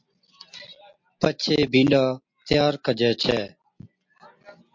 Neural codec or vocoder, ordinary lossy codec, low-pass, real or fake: none; MP3, 48 kbps; 7.2 kHz; real